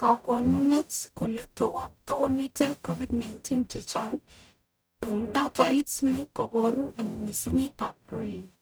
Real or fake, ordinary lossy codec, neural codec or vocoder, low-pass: fake; none; codec, 44.1 kHz, 0.9 kbps, DAC; none